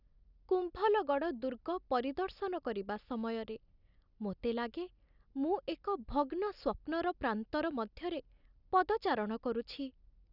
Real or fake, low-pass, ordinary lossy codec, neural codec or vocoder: real; 5.4 kHz; none; none